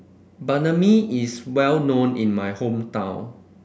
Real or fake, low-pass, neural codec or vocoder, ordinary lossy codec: real; none; none; none